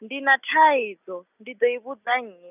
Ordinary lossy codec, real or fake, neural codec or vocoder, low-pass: none; real; none; 3.6 kHz